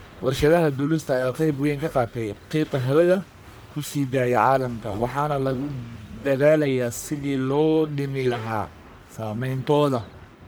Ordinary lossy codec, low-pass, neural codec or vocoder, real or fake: none; none; codec, 44.1 kHz, 1.7 kbps, Pupu-Codec; fake